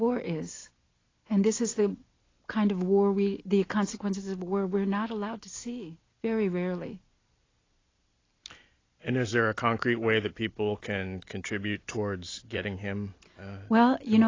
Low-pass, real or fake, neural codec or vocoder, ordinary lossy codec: 7.2 kHz; real; none; AAC, 32 kbps